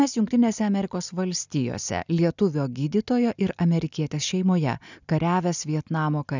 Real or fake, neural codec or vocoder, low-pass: real; none; 7.2 kHz